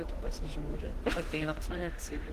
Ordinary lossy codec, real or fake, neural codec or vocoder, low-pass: Opus, 16 kbps; fake; autoencoder, 48 kHz, 32 numbers a frame, DAC-VAE, trained on Japanese speech; 14.4 kHz